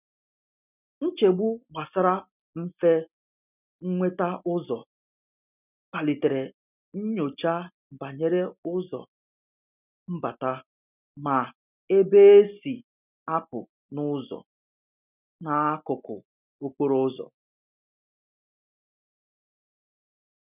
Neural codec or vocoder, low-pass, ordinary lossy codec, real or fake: none; 3.6 kHz; none; real